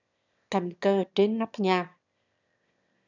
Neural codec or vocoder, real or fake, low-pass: autoencoder, 22.05 kHz, a latent of 192 numbers a frame, VITS, trained on one speaker; fake; 7.2 kHz